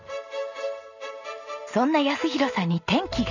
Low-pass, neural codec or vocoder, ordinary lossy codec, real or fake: 7.2 kHz; none; none; real